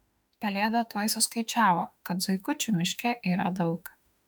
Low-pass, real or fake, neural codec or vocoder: 19.8 kHz; fake; autoencoder, 48 kHz, 32 numbers a frame, DAC-VAE, trained on Japanese speech